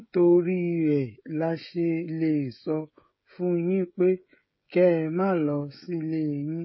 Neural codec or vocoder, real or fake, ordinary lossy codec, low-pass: codec, 16 kHz, 16 kbps, FreqCodec, smaller model; fake; MP3, 24 kbps; 7.2 kHz